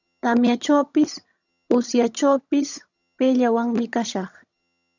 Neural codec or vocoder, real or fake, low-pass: vocoder, 22.05 kHz, 80 mel bands, HiFi-GAN; fake; 7.2 kHz